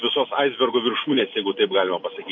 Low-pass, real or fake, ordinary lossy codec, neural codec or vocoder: 7.2 kHz; real; MP3, 32 kbps; none